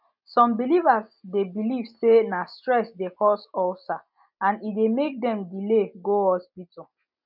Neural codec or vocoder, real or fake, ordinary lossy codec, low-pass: none; real; none; 5.4 kHz